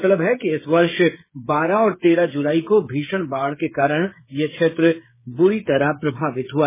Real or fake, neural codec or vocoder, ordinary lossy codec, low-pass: fake; codec, 16 kHz, 8 kbps, FreqCodec, smaller model; MP3, 16 kbps; 3.6 kHz